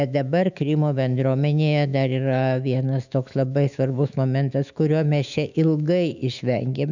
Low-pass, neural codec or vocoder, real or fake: 7.2 kHz; autoencoder, 48 kHz, 128 numbers a frame, DAC-VAE, trained on Japanese speech; fake